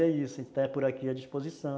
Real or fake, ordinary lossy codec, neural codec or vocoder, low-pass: real; none; none; none